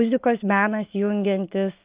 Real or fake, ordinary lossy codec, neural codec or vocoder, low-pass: real; Opus, 24 kbps; none; 3.6 kHz